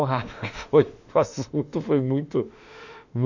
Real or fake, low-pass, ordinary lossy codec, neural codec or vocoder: fake; 7.2 kHz; none; autoencoder, 48 kHz, 32 numbers a frame, DAC-VAE, trained on Japanese speech